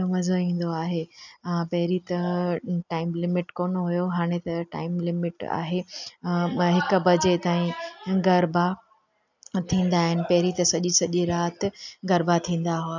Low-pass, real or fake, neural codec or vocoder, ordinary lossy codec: 7.2 kHz; real; none; none